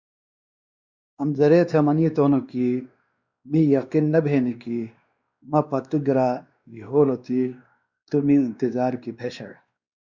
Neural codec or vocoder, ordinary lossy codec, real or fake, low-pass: codec, 16 kHz, 2 kbps, X-Codec, WavLM features, trained on Multilingual LibriSpeech; Opus, 64 kbps; fake; 7.2 kHz